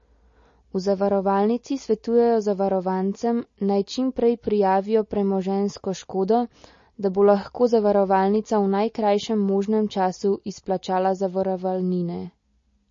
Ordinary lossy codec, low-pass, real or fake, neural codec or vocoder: MP3, 32 kbps; 7.2 kHz; real; none